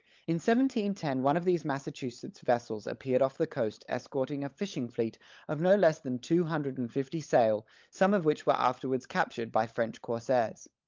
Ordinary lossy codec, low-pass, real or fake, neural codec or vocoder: Opus, 24 kbps; 7.2 kHz; fake; codec, 16 kHz, 16 kbps, FunCodec, trained on LibriTTS, 50 frames a second